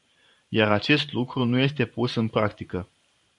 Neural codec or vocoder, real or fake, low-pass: vocoder, 44.1 kHz, 128 mel bands every 512 samples, BigVGAN v2; fake; 10.8 kHz